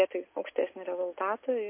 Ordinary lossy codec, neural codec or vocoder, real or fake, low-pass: MP3, 24 kbps; none; real; 3.6 kHz